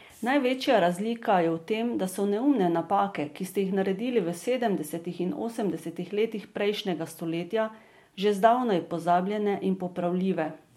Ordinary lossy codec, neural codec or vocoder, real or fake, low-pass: MP3, 64 kbps; none; real; 19.8 kHz